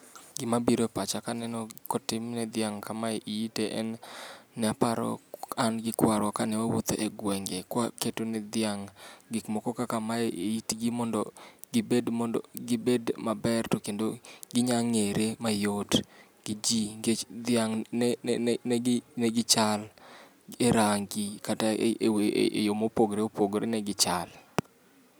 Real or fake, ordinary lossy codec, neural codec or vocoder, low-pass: real; none; none; none